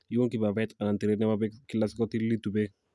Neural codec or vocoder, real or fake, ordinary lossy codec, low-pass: none; real; none; none